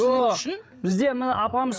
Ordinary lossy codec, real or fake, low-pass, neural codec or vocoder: none; fake; none; codec, 16 kHz, 8 kbps, FreqCodec, larger model